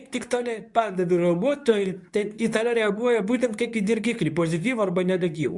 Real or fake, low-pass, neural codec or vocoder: fake; 10.8 kHz; codec, 24 kHz, 0.9 kbps, WavTokenizer, medium speech release version 2